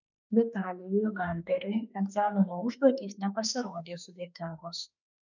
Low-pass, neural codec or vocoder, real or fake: 7.2 kHz; autoencoder, 48 kHz, 32 numbers a frame, DAC-VAE, trained on Japanese speech; fake